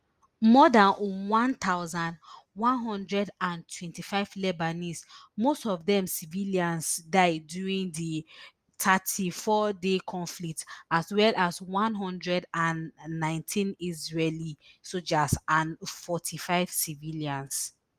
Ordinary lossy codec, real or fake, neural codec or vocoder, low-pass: Opus, 32 kbps; real; none; 14.4 kHz